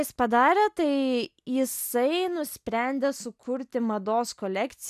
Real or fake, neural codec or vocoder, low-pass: real; none; 14.4 kHz